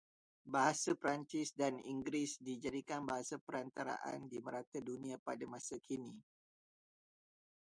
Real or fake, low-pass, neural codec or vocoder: real; 9.9 kHz; none